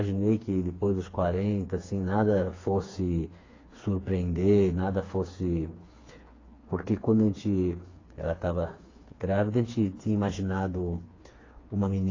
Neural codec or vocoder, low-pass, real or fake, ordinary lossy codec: codec, 16 kHz, 4 kbps, FreqCodec, smaller model; 7.2 kHz; fake; AAC, 32 kbps